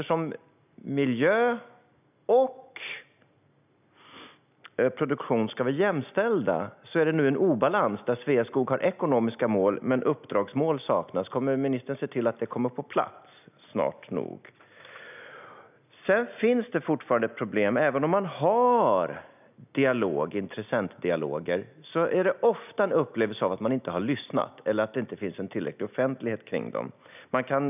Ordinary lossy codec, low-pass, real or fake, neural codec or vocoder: none; 3.6 kHz; real; none